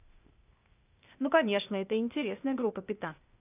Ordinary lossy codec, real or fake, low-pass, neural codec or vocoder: none; fake; 3.6 kHz; codec, 16 kHz, 0.8 kbps, ZipCodec